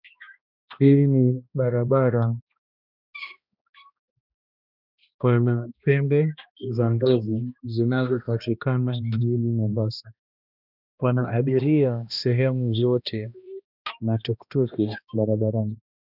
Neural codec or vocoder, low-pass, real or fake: codec, 16 kHz, 1 kbps, X-Codec, HuBERT features, trained on balanced general audio; 5.4 kHz; fake